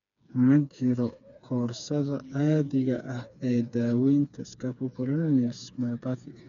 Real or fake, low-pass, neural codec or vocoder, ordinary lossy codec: fake; 7.2 kHz; codec, 16 kHz, 4 kbps, FreqCodec, smaller model; none